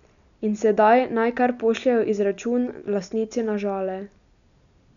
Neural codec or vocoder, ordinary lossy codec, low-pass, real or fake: none; none; 7.2 kHz; real